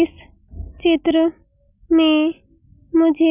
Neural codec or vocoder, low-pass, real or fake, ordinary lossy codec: none; 3.6 kHz; real; none